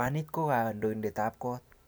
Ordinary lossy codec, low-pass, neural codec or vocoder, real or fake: none; none; none; real